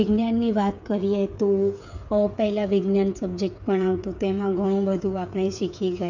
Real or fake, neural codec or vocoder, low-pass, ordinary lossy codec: fake; codec, 16 kHz, 8 kbps, FreqCodec, smaller model; 7.2 kHz; none